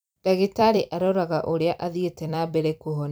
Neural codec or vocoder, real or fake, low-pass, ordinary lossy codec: vocoder, 44.1 kHz, 128 mel bands every 256 samples, BigVGAN v2; fake; none; none